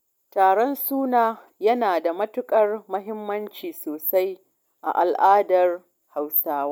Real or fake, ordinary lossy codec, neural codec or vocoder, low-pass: real; none; none; none